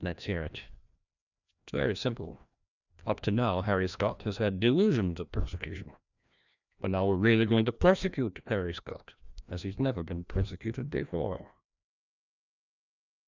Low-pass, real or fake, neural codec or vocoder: 7.2 kHz; fake; codec, 16 kHz, 1 kbps, FreqCodec, larger model